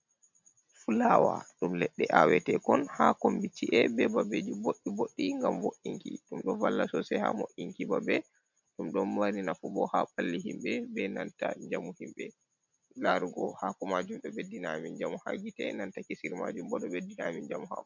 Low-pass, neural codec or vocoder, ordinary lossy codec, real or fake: 7.2 kHz; none; MP3, 64 kbps; real